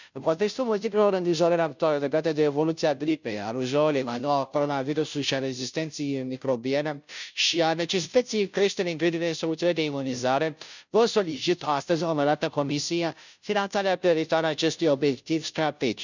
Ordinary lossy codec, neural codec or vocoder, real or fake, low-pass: none; codec, 16 kHz, 0.5 kbps, FunCodec, trained on Chinese and English, 25 frames a second; fake; 7.2 kHz